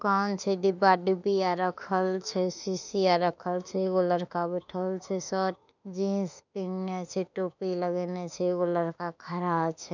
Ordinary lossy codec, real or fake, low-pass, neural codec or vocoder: none; fake; 7.2 kHz; autoencoder, 48 kHz, 32 numbers a frame, DAC-VAE, trained on Japanese speech